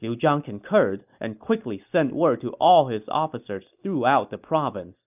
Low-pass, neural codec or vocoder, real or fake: 3.6 kHz; none; real